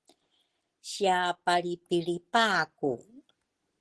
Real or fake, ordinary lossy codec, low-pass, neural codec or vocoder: real; Opus, 16 kbps; 10.8 kHz; none